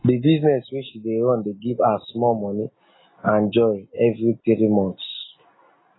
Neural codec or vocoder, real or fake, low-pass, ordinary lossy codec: none; real; 7.2 kHz; AAC, 16 kbps